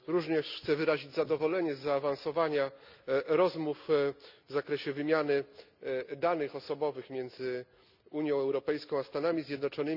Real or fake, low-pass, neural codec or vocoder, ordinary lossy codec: real; 5.4 kHz; none; none